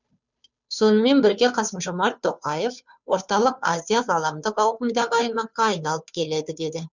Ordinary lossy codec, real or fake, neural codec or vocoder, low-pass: none; fake; codec, 16 kHz, 2 kbps, FunCodec, trained on Chinese and English, 25 frames a second; 7.2 kHz